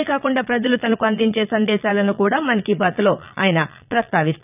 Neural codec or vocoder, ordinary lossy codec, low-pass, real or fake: vocoder, 22.05 kHz, 80 mel bands, Vocos; none; 3.6 kHz; fake